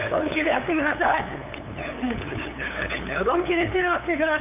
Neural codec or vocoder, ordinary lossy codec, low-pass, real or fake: codec, 16 kHz, 8 kbps, FunCodec, trained on LibriTTS, 25 frames a second; none; 3.6 kHz; fake